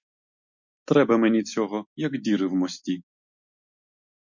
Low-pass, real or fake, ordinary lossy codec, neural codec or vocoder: 7.2 kHz; real; MP3, 48 kbps; none